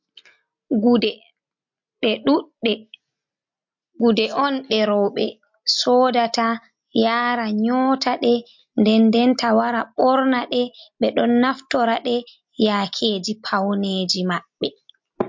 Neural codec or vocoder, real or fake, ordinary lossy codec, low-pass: none; real; MP3, 48 kbps; 7.2 kHz